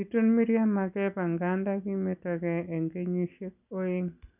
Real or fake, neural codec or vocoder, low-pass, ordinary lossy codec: real; none; 3.6 kHz; none